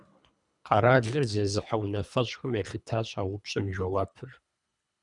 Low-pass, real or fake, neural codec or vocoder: 10.8 kHz; fake; codec, 24 kHz, 3 kbps, HILCodec